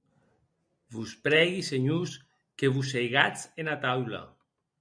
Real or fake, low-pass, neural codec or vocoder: real; 9.9 kHz; none